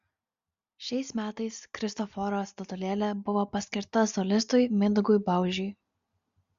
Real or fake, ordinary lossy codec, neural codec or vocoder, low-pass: real; Opus, 64 kbps; none; 7.2 kHz